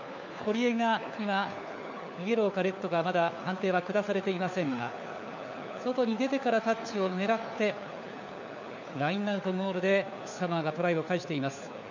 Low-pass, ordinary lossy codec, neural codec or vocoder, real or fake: 7.2 kHz; none; codec, 16 kHz, 4 kbps, FunCodec, trained on LibriTTS, 50 frames a second; fake